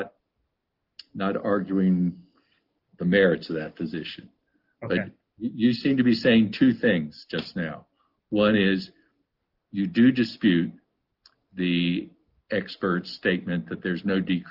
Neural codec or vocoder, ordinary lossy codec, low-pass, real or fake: none; Opus, 32 kbps; 5.4 kHz; real